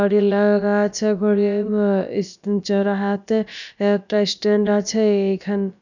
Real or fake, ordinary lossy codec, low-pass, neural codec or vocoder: fake; none; 7.2 kHz; codec, 16 kHz, about 1 kbps, DyCAST, with the encoder's durations